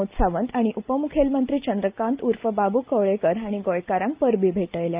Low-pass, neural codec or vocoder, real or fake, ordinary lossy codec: 3.6 kHz; none; real; Opus, 32 kbps